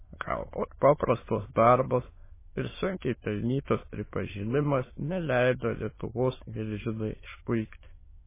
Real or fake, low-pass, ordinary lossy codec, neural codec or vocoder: fake; 3.6 kHz; MP3, 16 kbps; autoencoder, 22.05 kHz, a latent of 192 numbers a frame, VITS, trained on many speakers